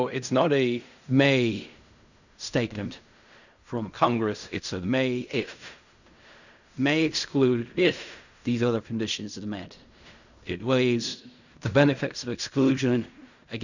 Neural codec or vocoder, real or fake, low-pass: codec, 16 kHz in and 24 kHz out, 0.4 kbps, LongCat-Audio-Codec, fine tuned four codebook decoder; fake; 7.2 kHz